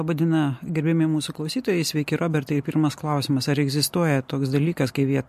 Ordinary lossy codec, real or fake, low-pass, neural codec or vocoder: MP3, 64 kbps; real; 14.4 kHz; none